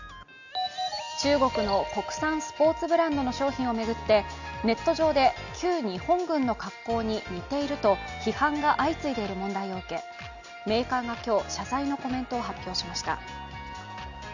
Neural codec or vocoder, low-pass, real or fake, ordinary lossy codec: none; 7.2 kHz; real; none